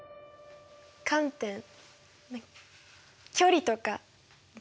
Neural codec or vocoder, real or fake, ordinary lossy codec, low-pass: none; real; none; none